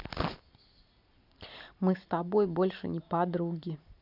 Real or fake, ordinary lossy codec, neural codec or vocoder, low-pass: real; none; none; 5.4 kHz